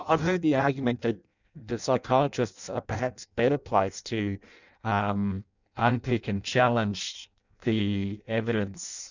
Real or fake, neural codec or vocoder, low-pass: fake; codec, 16 kHz in and 24 kHz out, 0.6 kbps, FireRedTTS-2 codec; 7.2 kHz